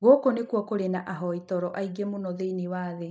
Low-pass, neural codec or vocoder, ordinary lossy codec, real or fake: none; none; none; real